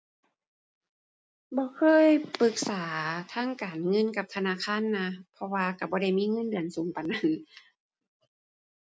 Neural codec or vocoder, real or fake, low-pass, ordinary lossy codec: none; real; none; none